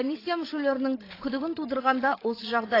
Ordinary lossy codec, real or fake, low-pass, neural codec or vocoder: AAC, 24 kbps; fake; 5.4 kHz; codec, 16 kHz, 16 kbps, FreqCodec, larger model